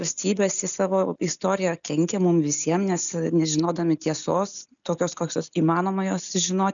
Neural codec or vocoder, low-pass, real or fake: none; 7.2 kHz; real